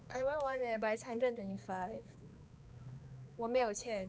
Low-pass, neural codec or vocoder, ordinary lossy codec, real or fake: none; codec, 16 kHz, 2 kbps, X-Codec, HuBERT features, trained on balanced general audio; none; fake